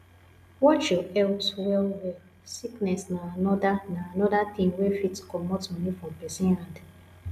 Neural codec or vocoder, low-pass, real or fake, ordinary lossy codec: none; 14.4 kHz; real; none